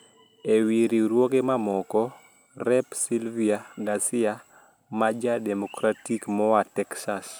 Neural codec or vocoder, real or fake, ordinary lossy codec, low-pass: none; real; none; none